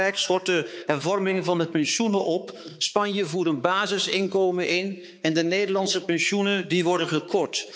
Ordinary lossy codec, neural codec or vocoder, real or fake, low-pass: none; codec, 16 kHz, 4 kbps, X-Codec, HuBERT features, trained on balanced general audio; fake; none